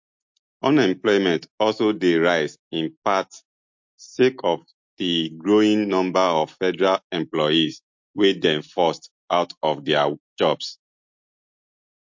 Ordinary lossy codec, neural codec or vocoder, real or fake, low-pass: MP3, 48 kbps; none; real; 7.2 kHz